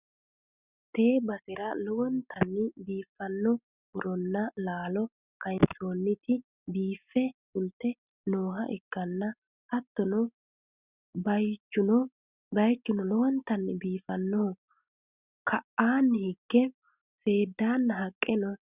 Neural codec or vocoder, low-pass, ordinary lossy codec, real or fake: none; 3.6 kHz; Opus, 64 kbps; real